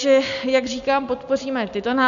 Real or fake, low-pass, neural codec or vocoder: real; 7.2 kHz; none